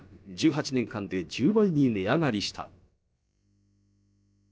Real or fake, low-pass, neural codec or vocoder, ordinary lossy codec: fake; none; codec, 16 kHz, about 1 kbps, DyCAST, with the encoder's durations; none